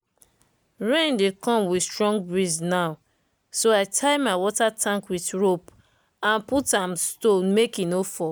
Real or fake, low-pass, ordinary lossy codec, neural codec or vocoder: real; none; none; none